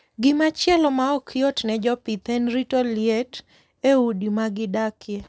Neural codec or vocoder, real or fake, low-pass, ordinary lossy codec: none; real; none; none